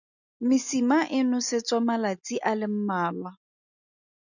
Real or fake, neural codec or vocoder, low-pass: real; none; 7.2 kHz